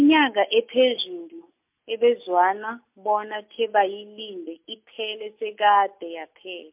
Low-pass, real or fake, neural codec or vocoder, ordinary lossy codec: 3.6 kHz; real; none; MP3, 32 kbps